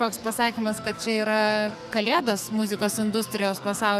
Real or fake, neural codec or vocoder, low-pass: fake; codec, 44.1 kHz, 2.6 kbps, SNAC; 14.4 kHz